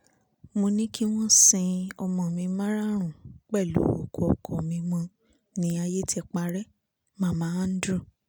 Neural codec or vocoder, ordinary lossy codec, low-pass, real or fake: none; none; none; real